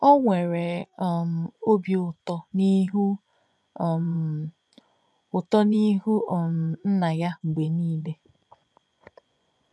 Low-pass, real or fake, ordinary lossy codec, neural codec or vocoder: none; fake; none; vocoder, 24 kHz, 100 mel bands, Vocos